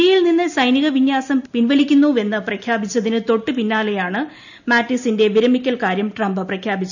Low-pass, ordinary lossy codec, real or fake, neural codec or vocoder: 7.2 kHz; none; real; none